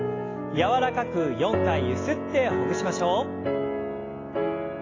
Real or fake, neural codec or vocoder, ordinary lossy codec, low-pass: real; none; AAC, 32 kbps; 7.2 kHz